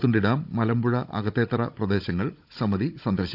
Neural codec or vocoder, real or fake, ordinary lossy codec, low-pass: codec, 16 kHz, 16 kbps, FunCodec, trained on Chinese and English, 50 frames a second; fake; none; 5.4 kHz